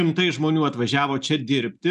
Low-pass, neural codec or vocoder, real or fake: 10.8 kHz; none; real